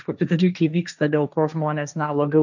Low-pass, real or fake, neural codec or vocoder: 7.2 kHz; fake; codec, 16 kHz, 1.1 kbps, Voila-Tokenizer